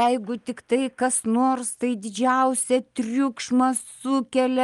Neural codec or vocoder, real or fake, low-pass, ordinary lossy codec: none; real; 10.8 kHz; Opus, 32 kbps